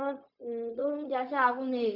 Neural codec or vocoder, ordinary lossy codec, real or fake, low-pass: codec, 16 kHz, 0.4 kbps, LongCat-Audio-Codec; none; fake; 5.4 kHz